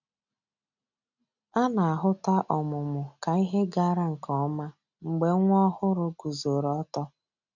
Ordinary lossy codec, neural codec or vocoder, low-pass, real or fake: none; none; 7.2 kHz; real